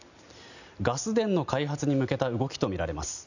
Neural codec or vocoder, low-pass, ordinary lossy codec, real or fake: none; 7.2 kHz; none; real